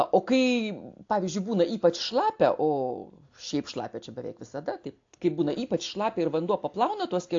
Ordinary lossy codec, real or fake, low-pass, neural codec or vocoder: AAC, 48 kbps; real; 7.2 kHz; none